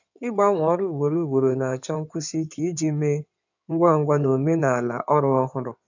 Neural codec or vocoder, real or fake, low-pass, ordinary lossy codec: codec, 16 kHz in and 24 kHz out, 2.2 kbps, FireRedTTS-2 codec; fake; 7.2 kHz; none